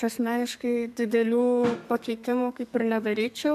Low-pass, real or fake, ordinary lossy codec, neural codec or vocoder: 14.4 kHz; fake; MP3, 96 kbps; codec, 32 kHz, 1.9 kbps, SNAC